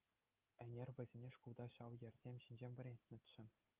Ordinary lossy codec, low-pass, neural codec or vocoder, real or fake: Opus, 24 kbps; 3.6 kHz; none; real